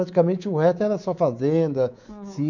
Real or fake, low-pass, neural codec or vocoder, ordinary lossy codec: fake; 7.2 kHz; codec, 24 kHz, 3.1 kbps, DualCodec; none